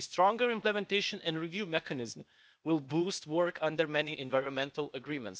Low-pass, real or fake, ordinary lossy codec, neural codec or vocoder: none; fake; none; codec, 16 kHz, 0.8 kbps, ZipCodec